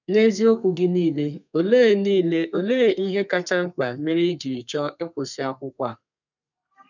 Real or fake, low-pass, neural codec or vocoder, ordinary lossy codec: fake; 7.2 kHz; codec, 32 kHz, 1.9 kbps, SNAC; none